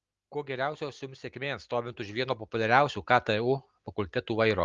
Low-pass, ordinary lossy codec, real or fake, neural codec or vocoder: 7.2 kHz; Opus, 32 kbps; real; none